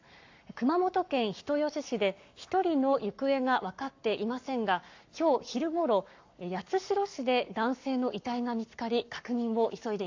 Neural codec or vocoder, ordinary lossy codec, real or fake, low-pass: codec, 44.1 kHz, 7.8 kbps, Pupu-Codec; AAC, 48 kbps; fake; 7.2 kHz